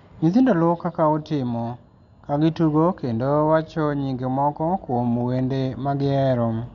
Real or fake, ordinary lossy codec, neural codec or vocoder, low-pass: real; none; none; 7.2 kHz